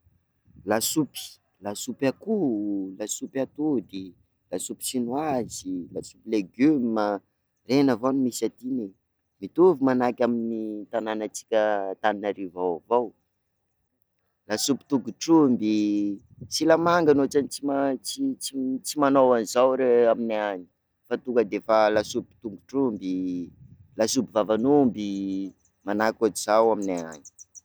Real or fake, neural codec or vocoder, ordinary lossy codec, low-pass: real; none; none; none